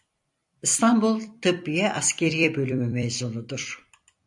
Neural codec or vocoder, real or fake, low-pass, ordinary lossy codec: vocoder, 44.1 kHz, 128 mel bands every 256 samples, BigVGAN v2; fake; 10.8 kHz; MP3, 64 kbps